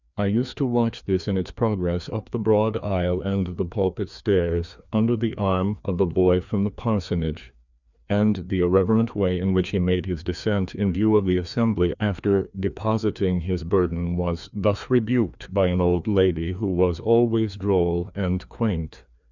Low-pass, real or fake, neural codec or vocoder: 7.2 kHz; fake; codec, 16 kHz, 2 kbps, FreqCodec, larger model